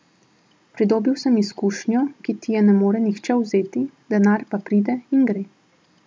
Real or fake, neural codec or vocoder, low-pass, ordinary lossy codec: real; none; none; none